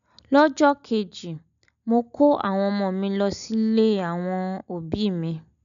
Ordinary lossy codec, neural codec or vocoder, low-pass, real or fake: none; none; 7.2 kHz; real